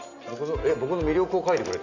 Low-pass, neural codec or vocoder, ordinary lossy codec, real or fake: 7.2 kHz; none; none; real